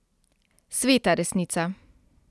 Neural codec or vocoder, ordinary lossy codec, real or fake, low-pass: none; none; real; none